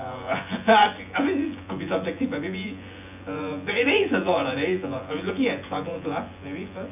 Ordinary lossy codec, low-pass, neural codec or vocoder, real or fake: none; 3.6 kHz; vocoder, 24 kHz, 100 mel bands, Vocos; fake